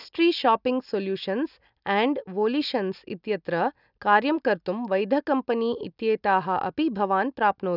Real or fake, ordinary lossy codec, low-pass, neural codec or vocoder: real; none; 5.4 kHz; none